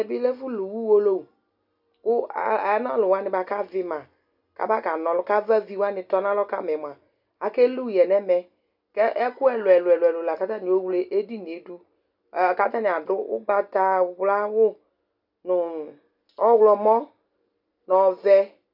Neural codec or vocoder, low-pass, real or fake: none; 5.4 kHz; real